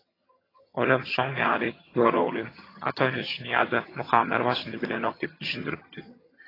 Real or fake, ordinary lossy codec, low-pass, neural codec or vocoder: fake; AAC, 24 kbps; 5.4 kHz; vocoder, 22.05 kHz, 80 mel bands, HiFi-GAN